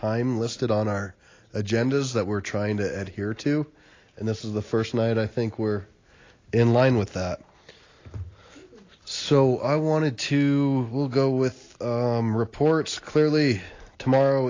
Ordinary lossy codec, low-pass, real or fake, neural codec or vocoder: AAC, 32 kbps; 7.2 kHz; real; none